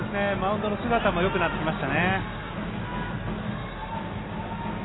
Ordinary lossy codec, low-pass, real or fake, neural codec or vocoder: AAC, 16 kbps; 7.2 kHz; real; none